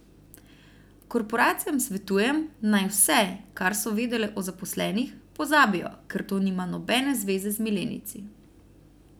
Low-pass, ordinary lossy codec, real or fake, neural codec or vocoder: none; none; real; none